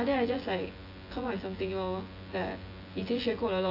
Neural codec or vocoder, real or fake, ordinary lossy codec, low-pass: vocoder, 24 kHz, 100 mel bands, Vocos; fake; AAC, 32 kbps; 5.4 kHz